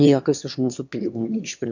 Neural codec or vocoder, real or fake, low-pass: autoencoder, 22.05 kHz, a latent of 192 numbers a frame, VITS, trained on one speaker; fake; 7.2 kHz